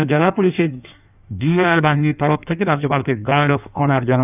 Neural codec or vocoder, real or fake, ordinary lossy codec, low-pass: codec, 16 kHz in and 24 kHz out, 1.1 kbps, FireRedTTS-2 codec; fake; none; 3.6 kHz